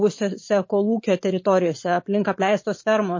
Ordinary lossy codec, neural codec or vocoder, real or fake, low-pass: MP3, 32 kbps; vocoder, 44.1 kHz, 128 mel bands every 512 samples, BigVGAN v2; fake; 7.2 kHz